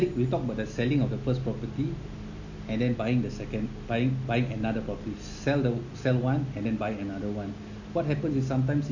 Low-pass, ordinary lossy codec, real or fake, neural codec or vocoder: 7.2 kHz; none; real; none